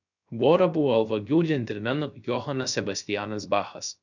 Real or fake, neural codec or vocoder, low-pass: fake; codec, 16 kHz, 0.3 kbps, FocalCodec; 7.2 kHz